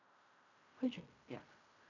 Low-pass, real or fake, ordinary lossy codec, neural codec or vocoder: 7.2 kHz; fake; none; codec, 16 kHz in and 24 kHz out, 0.4 kbps, LongCat-Audio-Codec, fine tuned four codebook decoder